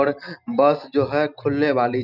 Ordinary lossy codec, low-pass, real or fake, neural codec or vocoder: none; 5.4 kHz; real; none